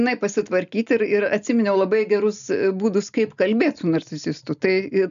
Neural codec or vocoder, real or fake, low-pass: none; real; 7.2 kHz